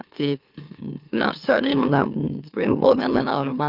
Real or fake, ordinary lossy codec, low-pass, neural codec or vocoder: fake; Opus, 24 kbps; 5.4 kHz; autoencoder, 44.1 kHz, a latent of 192 numbers a frame, MeloTTS